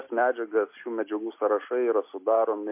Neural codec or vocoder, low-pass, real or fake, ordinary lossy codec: none; 3.6 kHz; real; MP3, 24 kbps